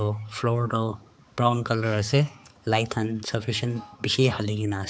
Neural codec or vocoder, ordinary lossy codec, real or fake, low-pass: codec, 16 kHz, 4 kbps, X-Codec, HuBERT features, trained on balanced general audio; none; fake; none